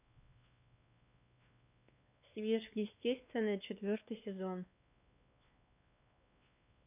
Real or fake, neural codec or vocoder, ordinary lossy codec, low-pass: fake; codec, 16 kHz, 2 kbps, X-Codec, WavLM features, trained on Multilingual LibriSpeech; none; 3.6 kHz